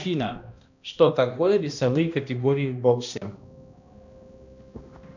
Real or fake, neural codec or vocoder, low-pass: fake; codec, 16 kHz, 1 kbps, X-Codec, HuBERT features, trained on balanced general audio; 7.2 kHz